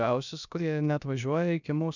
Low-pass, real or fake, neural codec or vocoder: 7.2 kHz; fake; codec, 16 kHz, about 1 kbps, DyCAST, with the encoder's durations